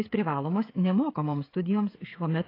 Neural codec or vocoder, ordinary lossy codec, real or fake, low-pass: codec, 16 kHz, 16 kbps, FreqCodec, smaller model; AAC, 24 kbps; fake; 5.4 kHz